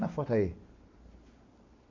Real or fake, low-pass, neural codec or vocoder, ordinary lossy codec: real; 7.2 kHz; none; MP3, 64 kbps